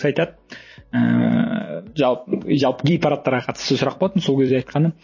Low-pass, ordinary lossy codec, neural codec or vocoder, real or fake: 7.2 kHz; MP3, 32 kbps; vocoder, 44.1 kHz, 128 mel bands every 256 samples, BigVGAN v2; fake